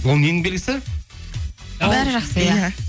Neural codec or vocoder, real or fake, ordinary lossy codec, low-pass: none; real; none; none